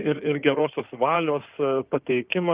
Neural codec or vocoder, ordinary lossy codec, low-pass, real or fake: codec, 16 kHz in and 24 kHz out, 2.2 kbps, FireRedTTS-2 codec; Opus, 24 kbps; 3.6 kHz; fake